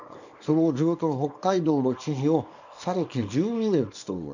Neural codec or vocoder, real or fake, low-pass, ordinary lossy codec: codec, 24 kHz, 0.9 kbps, WavTokenizer, small release; fake; 7.2 kHz; none